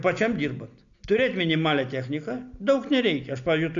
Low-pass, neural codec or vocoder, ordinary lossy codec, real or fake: 7.2 kHz; none; MP3, 96 kbps; real